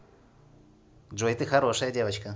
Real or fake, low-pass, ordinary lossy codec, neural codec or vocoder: real; none; none; none